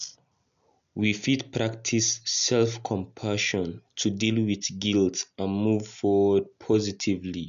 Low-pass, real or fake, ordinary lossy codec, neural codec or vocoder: 7.2 kHz; real; none; none